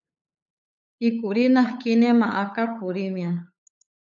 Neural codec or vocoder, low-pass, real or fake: codec, 16 kHz, 8 kbps, FunCodec, trained on LibriTTS, 25 frames a second; 7.2 kHz; fake